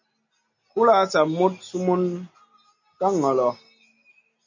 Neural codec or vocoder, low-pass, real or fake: none; 7.2 kHz; real